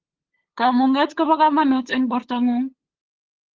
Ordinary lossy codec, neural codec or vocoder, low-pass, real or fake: Opus, 16 kbps; codec, 16 kHz, 8 kbps, FunCodec, trained on LibriTTS, 25 frames a second; 7.2 kHz; fake